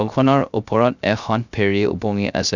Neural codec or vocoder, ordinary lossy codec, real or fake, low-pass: codec, 16 kHz, 0.3 kbps, FocalCodec; none; fake; 7.2 kHz